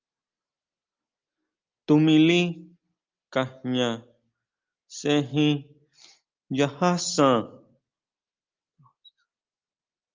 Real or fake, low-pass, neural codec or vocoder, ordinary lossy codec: real; 7.2 kHz; none; Opus, 24 kbps